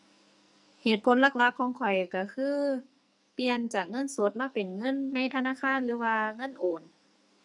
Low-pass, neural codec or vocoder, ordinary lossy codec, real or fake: 10.8 kHz; codec, 44.1 kHz, 2.6 kbps, SNAC; none; fake